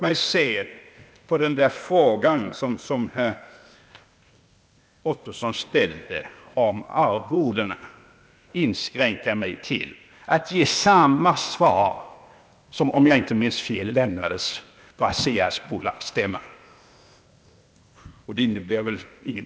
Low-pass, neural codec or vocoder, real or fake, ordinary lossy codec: none; codec, 16 kHz, 0.8 kbps, ZipCodec; fake; none